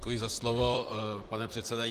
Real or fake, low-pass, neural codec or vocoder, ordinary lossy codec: fake; 14.4 kHz; vocoder, 44.1 kHz, 128 mel bands, Pupu-Vocoder; Opus, 24 kbps